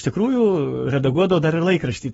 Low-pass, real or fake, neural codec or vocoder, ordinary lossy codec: 19.8 kHz; fake; codec, 44.1 kHz, 7.8 kbps, Pupu-Codec; AAC, 24 kbps